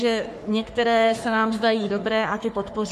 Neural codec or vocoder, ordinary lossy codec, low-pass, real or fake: codec, 44.1 kHz, 3.4 kbps, Pupu-Codec; MP3, 64 kbps; 14.4 kHz; fake